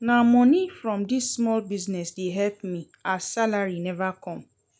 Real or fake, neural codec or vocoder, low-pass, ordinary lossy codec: real; none; none; none